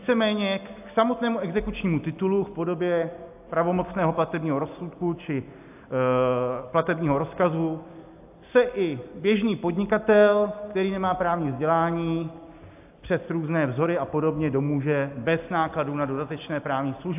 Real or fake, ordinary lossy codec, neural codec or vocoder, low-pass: real; AAC, 32 kbps; none; 3.6 kHz